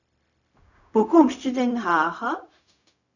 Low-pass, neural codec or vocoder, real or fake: 7.2 kHz; codec, 16 kHz, 0.4 kbps, LongCat-Audio-Codec; fake